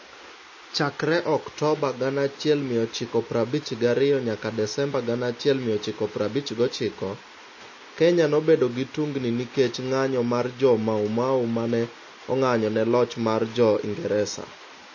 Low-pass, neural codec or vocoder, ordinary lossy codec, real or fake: 7.2 kHz; none; MP3, 32 kbps; real